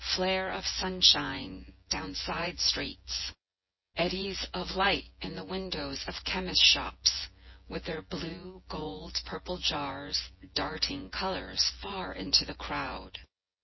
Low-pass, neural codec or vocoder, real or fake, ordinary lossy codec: 7.2 kHz; vocoder, 24 kHz, 100 mel bands, Vocos; fake; MP3, 24 kbps